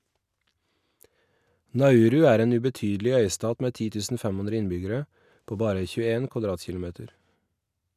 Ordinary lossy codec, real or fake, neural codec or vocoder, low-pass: none; real; none; 14.4 kHz